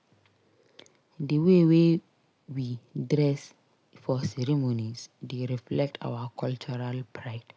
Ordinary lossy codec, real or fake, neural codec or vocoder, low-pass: none; real; none; none